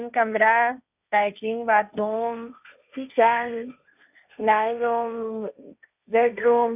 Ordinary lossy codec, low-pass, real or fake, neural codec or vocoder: none; 3.6 kHz; fake; codec, 16 kHz, 1.1 kbps, Voila-Tokenizer